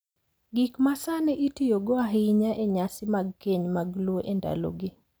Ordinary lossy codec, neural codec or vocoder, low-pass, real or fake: none; none; none; real